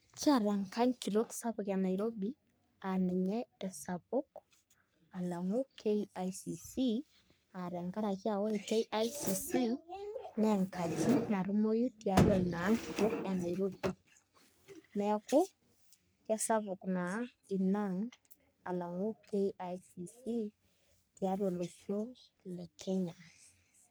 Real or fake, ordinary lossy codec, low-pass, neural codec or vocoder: fake; none; none; codec, 44.1 kHz, 3.4 kbps, Pupu-Codec